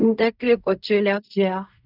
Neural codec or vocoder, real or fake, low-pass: codec, 16 kHz in and 24 kHz out, 0.4 kbps, LongCat-Audio-Codec, fine tuned four codebook decoder; fake; 5.4 kHz